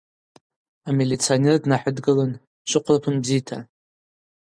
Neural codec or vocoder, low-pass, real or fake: none; 9.9 kHz; real